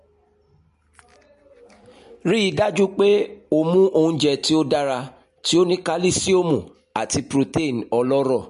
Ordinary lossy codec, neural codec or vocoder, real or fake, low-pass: MP3, 48 kbps; none; real; 14.4 kHz